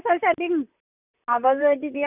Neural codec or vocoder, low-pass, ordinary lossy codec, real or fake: vocoder, 44.1 kHz, 128 mel bands, Pupu-Vocoder; 3.6 kHz; none; fake